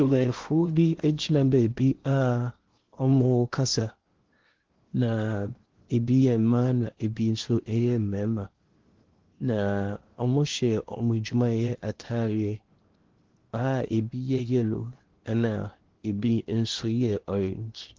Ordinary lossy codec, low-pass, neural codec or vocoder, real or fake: Opus, 16 kbps; 7.2 kHz; codec, 16 kHz in and 24 kHz out, 0.8 kbps, FocalCodec, streaming, 65536 codes; fake